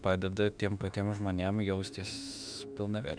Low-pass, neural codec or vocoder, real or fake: 9.9 kHz; autoencoder, 48 kHz, 32 numbers a frame, DAC-VAE, trained on Japanese speech; fake